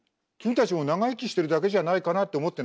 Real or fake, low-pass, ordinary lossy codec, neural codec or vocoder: real; none; none; none